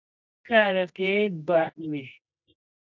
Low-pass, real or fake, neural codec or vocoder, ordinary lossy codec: 7.2 kHz; fake; codec, 24 kHz, 0.9 kbps, WavTokenizer, medium music audio release; MP3, 64 kbps